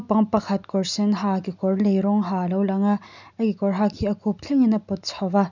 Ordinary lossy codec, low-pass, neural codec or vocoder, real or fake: none; 7.2 kHz; none; real